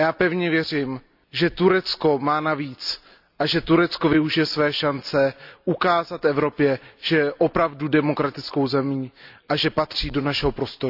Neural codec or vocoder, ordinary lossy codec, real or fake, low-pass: none; none; real; 5.4 kHz